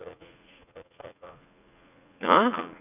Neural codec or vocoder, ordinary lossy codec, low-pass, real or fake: vocoder, 44.1 kHz, 80 mel bands, Vocos; none; 3.6 kHz; fake